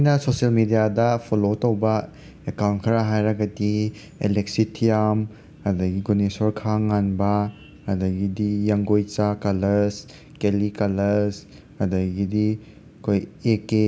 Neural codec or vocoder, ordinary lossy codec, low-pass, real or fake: none; none; none; real